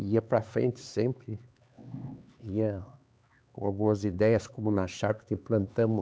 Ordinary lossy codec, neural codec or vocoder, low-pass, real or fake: none; codec, 16 kHz, 4 kbps, X-Codec, HuBERT features, trained on LibriSpeech; none; fake